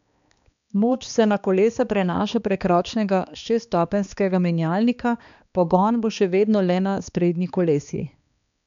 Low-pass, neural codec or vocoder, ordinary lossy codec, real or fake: 7.2 kHz; codec, 16 kHz, 2 kbps, X-Codec, HuBERT features, trained on balanced general audio; none; fake